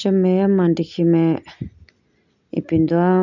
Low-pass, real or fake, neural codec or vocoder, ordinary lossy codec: 7.2 kHz; real; none; none